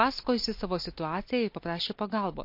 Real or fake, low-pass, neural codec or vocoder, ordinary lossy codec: real; 5.4 kHz; none; MP3, 32 kbps